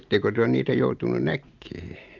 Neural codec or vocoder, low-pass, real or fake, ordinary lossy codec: none; 7.2 kHz; real; Opus, 24 kbps